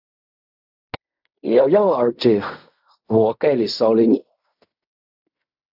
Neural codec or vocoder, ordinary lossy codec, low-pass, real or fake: codec, 16 kHz in and 24 kHz out, 0.4 kbps, LongCat-Audio-Codec, fine tuned four codebook decoder; AAC, 48 kbps; 5.4 kHz; fake